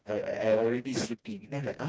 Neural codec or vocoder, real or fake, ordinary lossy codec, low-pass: codec, 16 kHz, 1 kbps, FreqCodec, smaller model; fake; none; none